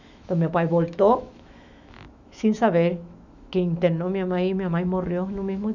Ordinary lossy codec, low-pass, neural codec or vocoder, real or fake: none; 7.2 kHz; autoencoder, 48 kHz, 128 numbers a frame, DAC-VAE, trained on Japanese speech; fake